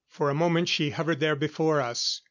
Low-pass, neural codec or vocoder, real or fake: 7.2 kHz; none; real